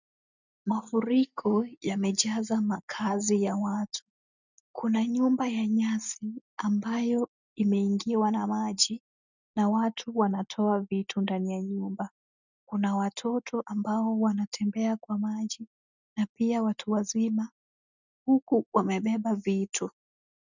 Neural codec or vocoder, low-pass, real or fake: none; 7.2 kHz; real